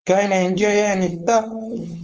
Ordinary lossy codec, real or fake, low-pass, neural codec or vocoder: Opus, 24 kbps; fake; 7.2 kHz; codec, 16 kHz, 4.8 kbps, FACodec